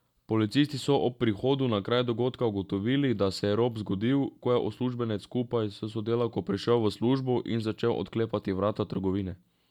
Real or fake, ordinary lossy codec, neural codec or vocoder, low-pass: real; none; none; 19.8 kHz